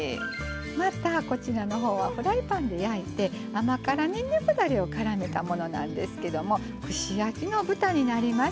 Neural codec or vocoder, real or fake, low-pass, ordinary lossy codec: none; real; none; none